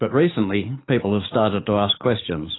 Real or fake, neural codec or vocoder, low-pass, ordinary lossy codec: fake; codec, 44.1 kHz, 7.8 kbps, DAC; 7.2 kHz; AAC, 16 kbps